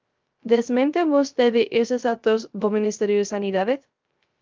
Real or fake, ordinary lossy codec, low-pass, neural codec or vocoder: fake; Opus, 24 kbps; 7.2 kHz; codec, 16 kHz, 0.3 kbps, FocalCodec